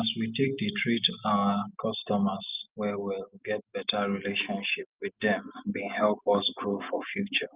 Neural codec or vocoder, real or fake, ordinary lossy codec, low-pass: none; real; Opus, 24 kbps; 3.6 kHz